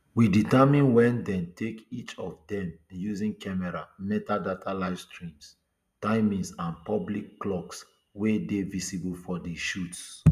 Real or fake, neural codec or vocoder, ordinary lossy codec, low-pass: real; none; none; 14.4 kHz